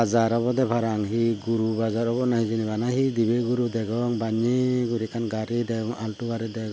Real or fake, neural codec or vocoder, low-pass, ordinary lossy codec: real; none; none; none